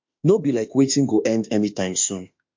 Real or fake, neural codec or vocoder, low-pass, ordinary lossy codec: fake; autoencoder, 48 kHz, 32 numbers a frame, DAC-VAE, trained on Japanese speech; 7.2 kHz; MP3, 48 kbps